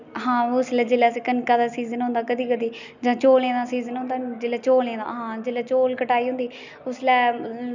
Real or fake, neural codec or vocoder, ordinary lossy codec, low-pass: real; none; none; 7.2 kHz